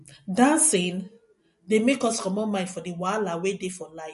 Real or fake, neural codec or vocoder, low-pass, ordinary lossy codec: real; none; 14.4 kHz; MP3, 48 kbps